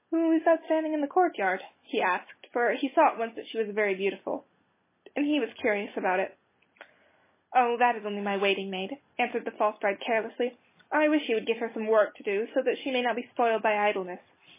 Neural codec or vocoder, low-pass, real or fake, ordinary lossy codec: none; 3.6 kHz; real; MP3, 16 kbps